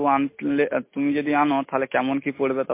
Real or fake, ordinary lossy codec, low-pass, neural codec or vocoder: real; MP3, 24 kbps; 3.6 kHz; none